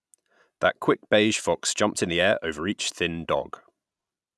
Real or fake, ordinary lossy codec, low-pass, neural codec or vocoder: real; none; none; none